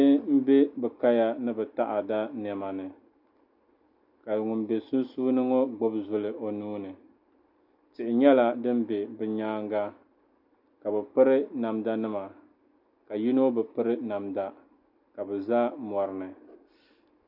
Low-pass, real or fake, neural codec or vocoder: 5.4 kHz; real; none